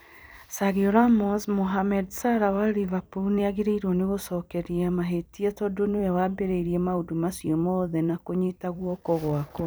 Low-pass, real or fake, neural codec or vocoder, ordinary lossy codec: none; fake; vocoder, 44.1 kHz, 128 mel bands every 256 samples, BigVGAN v2; none